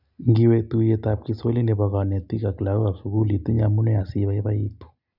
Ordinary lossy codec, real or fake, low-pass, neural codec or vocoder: none; real; 5.4 kHz; none